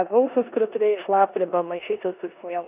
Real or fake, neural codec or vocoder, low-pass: fake; codec, 16 kHz in and 24 kHz out, 0.9 kbps, LongCat-Audio-Codec, four codebook decoder; 3.6 kHz